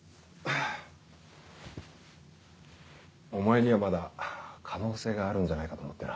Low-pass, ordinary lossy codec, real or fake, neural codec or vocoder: none; none; real; none